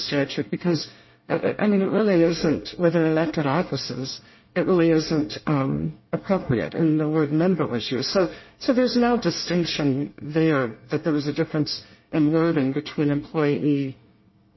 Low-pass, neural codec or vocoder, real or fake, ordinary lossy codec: 7.2 kHz; codec, 24 kHz, 1 kbps, SNAC; fake; MP3, 24 kbps